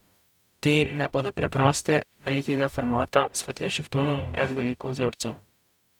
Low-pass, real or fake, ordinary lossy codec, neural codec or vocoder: 19.8 kHz; fake; none; codec, 44.1 kHz, 0.9 kbps, DAC